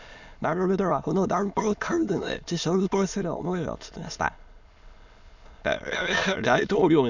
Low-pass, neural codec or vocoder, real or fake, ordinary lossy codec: 7.2 kHz; autoencoder, 22.05 kHz, a latent of 192 numbers a frame, VITS, trained on many speakers; fake; none